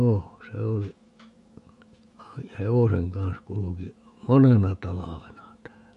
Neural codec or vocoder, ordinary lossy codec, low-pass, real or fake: autoencoder, 48 kHz, 128 numbers a frame, DAC-VAE, trained on Japanese speech; MP3, 48 kbps; 14.4 kHz; fake